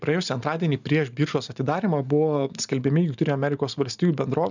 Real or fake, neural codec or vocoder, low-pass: real; none; 7.2 kHz